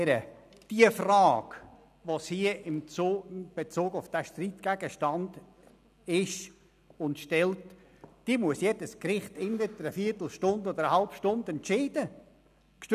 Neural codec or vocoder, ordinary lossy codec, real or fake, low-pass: none; none; real; 14.4 kHz